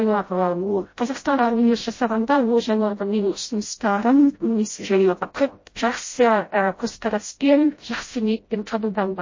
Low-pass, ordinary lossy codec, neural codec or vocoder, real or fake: 7.2 kHz; MP3, 32 kbps; codec, 16 kHz, 0.5 kbps, FreqCodec, smaller model; fake